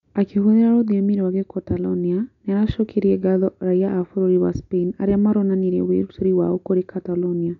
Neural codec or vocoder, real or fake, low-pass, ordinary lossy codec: none; real; 7.2 kHz; none